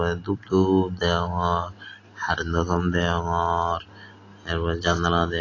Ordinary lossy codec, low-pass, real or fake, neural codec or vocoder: AAC, 32 kbps; 7.2 kHz; real; none